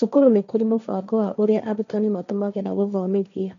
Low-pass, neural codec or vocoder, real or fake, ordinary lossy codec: 7.2 kHz; codec, 16 kHz, 1.1 kbps, Voila-Tokenizer; fake; none